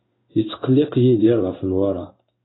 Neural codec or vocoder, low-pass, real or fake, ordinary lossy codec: codec, 16 kHz in and 24 kHz out, 1 kbps, XY-Tokenizer; 7.2 kHz; fake; AAC, 16 kbps